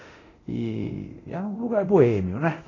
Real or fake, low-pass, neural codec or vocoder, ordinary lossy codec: fake; 7.2 kHz; codec, 24 kHz, 0.9 kbps, DualCodec; AAC, 32 kbps